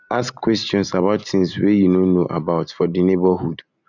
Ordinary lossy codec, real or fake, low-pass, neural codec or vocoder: none; fake; 7.2 kHz; vocoder, 44.1 kHz, 128 mel bands every 256 samples, BigVGAN v2